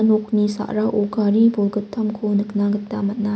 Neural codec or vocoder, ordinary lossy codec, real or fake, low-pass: none; none; real; none